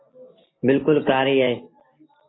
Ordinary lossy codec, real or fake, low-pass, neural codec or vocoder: AAC, 16 kbps; real; 7.2 kHz; none